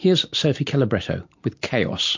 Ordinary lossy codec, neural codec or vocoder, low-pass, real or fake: MP3, 48 kbps; none; 7.2 kHz; real